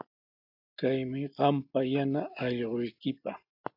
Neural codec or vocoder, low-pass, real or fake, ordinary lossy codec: none; 5.4 kHz; real; AAC, 48 kbps